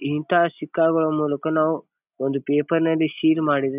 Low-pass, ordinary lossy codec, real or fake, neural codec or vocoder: 3.6 kHz; none; real; none